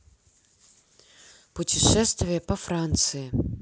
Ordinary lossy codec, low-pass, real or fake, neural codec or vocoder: none; none; real; none